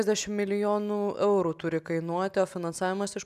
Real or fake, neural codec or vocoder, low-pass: real; none; 14.4 kHz